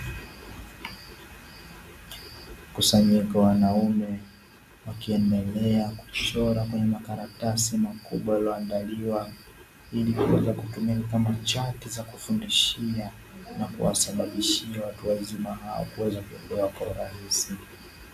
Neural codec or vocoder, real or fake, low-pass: none; real; 14.4 kHz